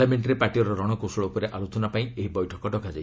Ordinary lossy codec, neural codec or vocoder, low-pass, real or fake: none; none; none; real